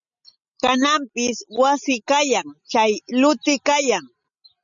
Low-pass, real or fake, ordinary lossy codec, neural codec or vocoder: 7.2 kHz; real; MP3, 96 kbps; none